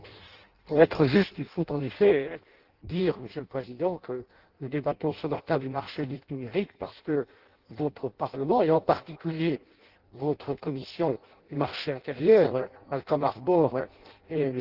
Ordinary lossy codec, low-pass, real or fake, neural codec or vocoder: Opus, 16 kbps; 5.4 kHz; fake; codec, 16 kHz in and 24 kHz out, 0.6 kbps, FireRedTTS-2 codec